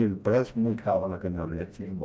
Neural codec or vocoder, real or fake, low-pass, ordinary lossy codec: codec, 16 kHz, 1 kbps, FreqCodec, smaller model; fake; none; none